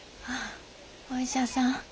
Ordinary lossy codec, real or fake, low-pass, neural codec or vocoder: none; real; none; none